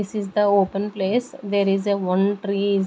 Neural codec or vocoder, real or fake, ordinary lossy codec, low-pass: none; real; none; none